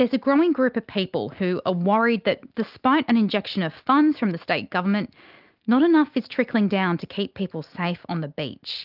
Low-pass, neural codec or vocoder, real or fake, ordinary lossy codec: 5.4 kHz; none; real; Opus, 24 kbps